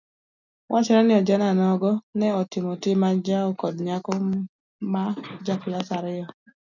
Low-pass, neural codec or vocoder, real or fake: 7.2 kHz; none; real